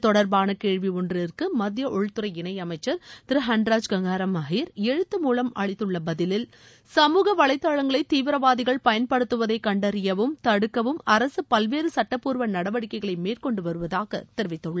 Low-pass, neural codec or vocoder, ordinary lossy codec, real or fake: none; none; none; real